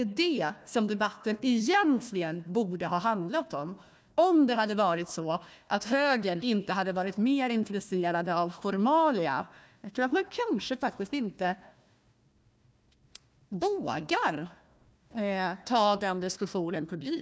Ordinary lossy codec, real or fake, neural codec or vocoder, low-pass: none; fake; codec, 16 kHz, 1 kbps, FunCodec, trained on Chinese and English, 50 frames a second; none